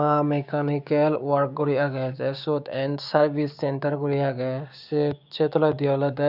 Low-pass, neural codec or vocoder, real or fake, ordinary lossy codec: 5.4 kHz; codec, 16 kHz, 6 kbps, DAC; fake; none